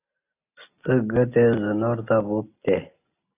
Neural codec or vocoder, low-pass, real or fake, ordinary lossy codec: none; 3.6 kHz; real; AAC, 24 kbps